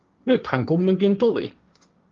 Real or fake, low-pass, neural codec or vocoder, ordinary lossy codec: fake; 7.2 kHz; codec, 16 kHz, 1.1 kbps, Voila-Tokenizer; Opus, 32 kbps